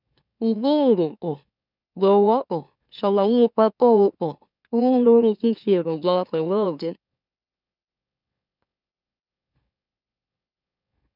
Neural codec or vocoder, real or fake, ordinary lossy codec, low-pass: autoencoder, 44.1 kHz, a latent of 192 numbers a frame, MeloTTS; fake; none; 5.4 kHz